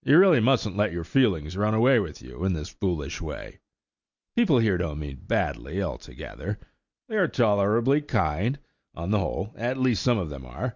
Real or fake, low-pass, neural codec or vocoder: real; 7.2 kHz; none